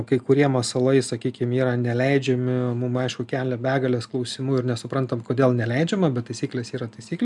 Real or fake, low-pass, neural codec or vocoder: real; 10.8 kHz; none